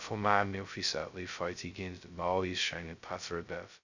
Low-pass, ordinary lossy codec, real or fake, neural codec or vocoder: 7.2 kHz; none; fake; codec, 16 kHz, 0.2 kbps, FocalCodec